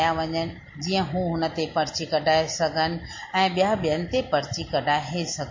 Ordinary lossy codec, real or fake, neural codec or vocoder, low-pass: MP3, 32 kbps; real; none; 7.2 kHz